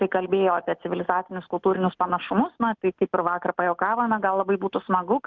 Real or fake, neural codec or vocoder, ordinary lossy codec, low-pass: real; none; Opus, 32 kbps; 7.2 kHz